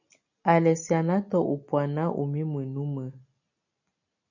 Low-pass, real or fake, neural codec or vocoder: 7.2 kHz; real; none